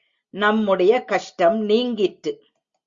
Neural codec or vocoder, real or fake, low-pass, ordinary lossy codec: none; real; 7.2 kHz; Opus, 64 kbps